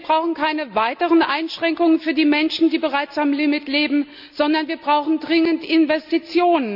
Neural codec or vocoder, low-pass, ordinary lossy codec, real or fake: none; 5.4 kHz; none; real